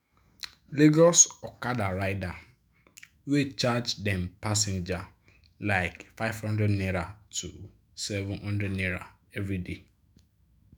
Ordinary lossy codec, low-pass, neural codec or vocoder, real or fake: none; none; autoencoder, 48 kHz, 128 numbers a frame, DAC-VAE, trained on Japanese speech; fake